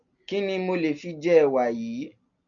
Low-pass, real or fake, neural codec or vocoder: 7.2 kHz; real; none